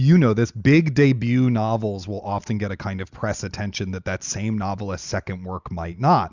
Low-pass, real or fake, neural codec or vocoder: 7.2 kHz; real; none